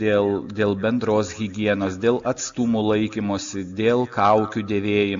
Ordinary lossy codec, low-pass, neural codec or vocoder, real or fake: Opus, 64 kbps; 7.2 kHz; none; real